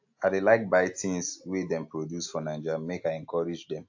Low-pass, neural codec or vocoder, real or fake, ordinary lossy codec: 7.2 kHz; none; real; AAC, 48 kbps